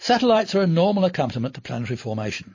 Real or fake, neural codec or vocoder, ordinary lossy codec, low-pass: fake; vocoder, 44.1 kHz, 128 mel bands every 512 samples, BigVGAN v2; MP3, 32 kbps; 7.2 kHz